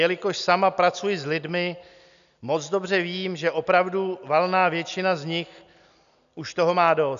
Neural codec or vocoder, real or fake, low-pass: none; real; 7.2 kHz